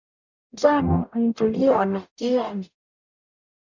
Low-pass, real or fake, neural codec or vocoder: 7.2 kHz; fake; codec, 44.1 kHz, 0.9 kbps, DAC